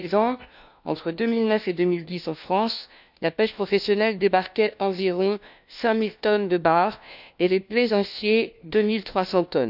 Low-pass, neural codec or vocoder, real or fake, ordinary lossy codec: 5.4 kHz; codec, 16 kHz, 1 kbps, FunCodec, trained on LibriTTS, 50 frames a second; fake; none